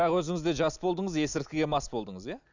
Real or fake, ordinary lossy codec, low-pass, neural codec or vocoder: real; none; 7.2 kHz; none